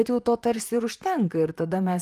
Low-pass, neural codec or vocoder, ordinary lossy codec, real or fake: 14.4 kHz; vocoder, 44.1 kHz, 128 mel bands, Pupu-Vocoder; Opus, 32 kbps; fake